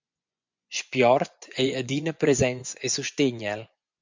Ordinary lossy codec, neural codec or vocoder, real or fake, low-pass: MP3, 64 kbps; vocoder, 24 kHz, 100 mel bands, Vocos; fake; 7.2 kHz